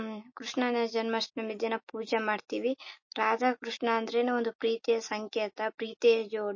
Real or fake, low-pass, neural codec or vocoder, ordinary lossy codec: real; 7.2 kHz; none; MP3, 32 kbps